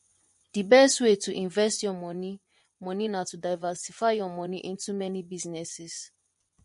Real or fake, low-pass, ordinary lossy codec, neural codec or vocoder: fake; 10.8 kHz; MP3, 48 kbps; vocoder, 24 kHz, 100 mel bands, Vocos